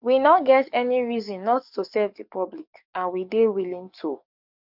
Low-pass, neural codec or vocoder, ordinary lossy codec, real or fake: 5.4 kHz; codec, 44.1 kHz, 7.8 kbps, DAC; none; fake